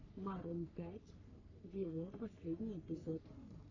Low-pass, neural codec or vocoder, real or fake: 7.2 kHz; codec, 44.1 kHz, 3.4 kbps, Pupu-Codec; fake